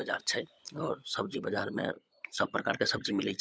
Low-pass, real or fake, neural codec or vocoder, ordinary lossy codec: none; fake; codec, 16 kHz, 16 kbps, FunCodec, trained on LibriTTS, 50 frames a second; none